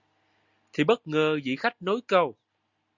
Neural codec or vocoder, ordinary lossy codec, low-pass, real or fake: none; Opus, 64 kbps; 7.2 kHz; real